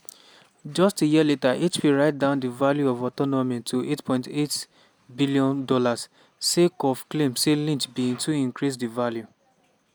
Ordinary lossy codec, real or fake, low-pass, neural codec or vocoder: none; real; none; none